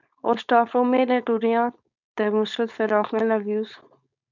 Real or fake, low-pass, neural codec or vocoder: fake; 7.2 kHz; codec, 16 kHz, 4.8 kbps, FACodec